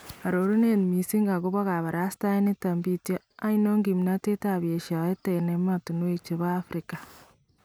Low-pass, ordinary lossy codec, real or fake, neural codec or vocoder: none; none; real; none